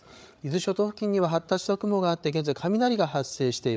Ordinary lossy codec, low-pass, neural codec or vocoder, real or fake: none; none; codec, 16 kHz, 8 kbps, FreqCodec, larger model; fake